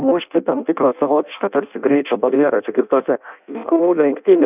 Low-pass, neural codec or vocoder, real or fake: 3.6 kHz; codec, 16 kHz in and 24 kHz out, 0.6 kbps, FireRedTTS-2 codec; fake